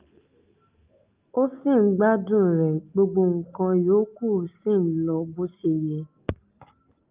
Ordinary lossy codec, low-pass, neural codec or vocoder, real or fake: Opus, 24 kbps; 3.6 kHz; none; real